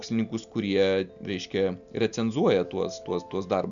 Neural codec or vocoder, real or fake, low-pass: none; real; 7.2 kHz